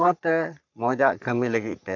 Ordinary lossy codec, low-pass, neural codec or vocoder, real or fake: none; 7.2 kHz; codec, 44.1 kHz, 3.4 kbps, Pupu-Codec; fake